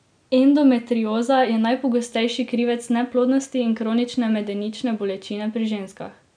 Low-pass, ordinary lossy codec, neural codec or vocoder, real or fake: 9.9 kHz; none; none; real